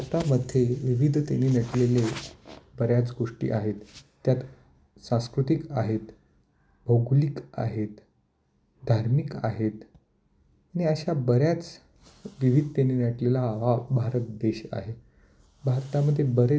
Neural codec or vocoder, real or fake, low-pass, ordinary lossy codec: none; real; none; none